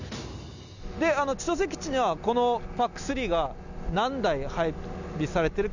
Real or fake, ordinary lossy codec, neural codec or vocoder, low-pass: real; none; none; 7.2 kHz